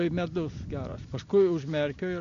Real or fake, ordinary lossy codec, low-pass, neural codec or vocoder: real; MP3, 64 kbps; 7.2 kHz; none